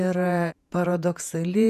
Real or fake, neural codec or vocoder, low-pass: fake; vocoder, 48 kHz, 128 mel bands, Vocos; 14.4 kHz